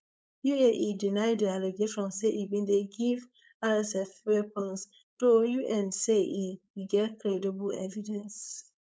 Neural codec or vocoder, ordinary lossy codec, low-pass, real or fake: codec, 16 kHz, 4.8 kbps, FACodec; none; none; fake